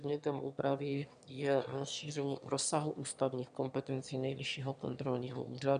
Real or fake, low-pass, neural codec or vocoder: fake; 9.9 kHz; autoencoder, 22.05 kHz, a latent of 192 numbers a frame, VITS, trained on one speaker